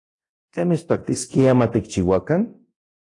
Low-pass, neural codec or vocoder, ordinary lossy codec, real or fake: 10.8 kHz; codec, 24 kHz, 0.9 kbps, DualCodec; AAC, 48 kbps; fake